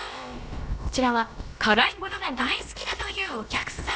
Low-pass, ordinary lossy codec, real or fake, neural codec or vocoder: none; none; fake; codec, 16 kHz, about 1 kbps, DyCAST, with the encoder's durations